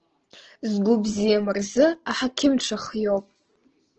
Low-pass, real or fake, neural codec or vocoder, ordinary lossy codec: 7.2 kHz; real; none; Opus, 16 kbps